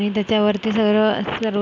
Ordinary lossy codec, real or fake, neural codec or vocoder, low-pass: none; real; none; none